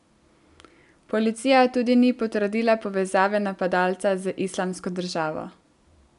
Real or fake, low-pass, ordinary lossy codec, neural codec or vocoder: real; 10.8 kHz; none; none